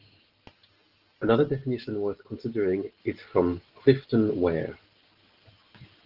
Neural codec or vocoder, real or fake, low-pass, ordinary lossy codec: none; real; 5.4 kHz; Opus, 16 kbps